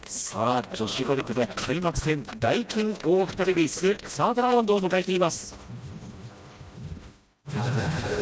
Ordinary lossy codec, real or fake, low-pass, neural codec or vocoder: none; fake; none; codec, 16 kHz, 1 kbps, FreqCodec, smaller model